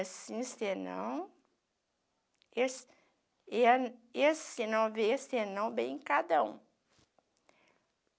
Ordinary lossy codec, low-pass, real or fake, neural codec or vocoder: none; none; real; none